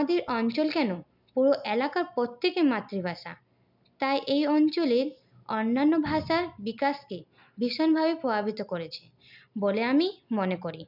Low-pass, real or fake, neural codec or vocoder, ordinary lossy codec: 5.4 kHz; real; none; none